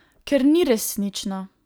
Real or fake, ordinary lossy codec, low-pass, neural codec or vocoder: real; none; none; none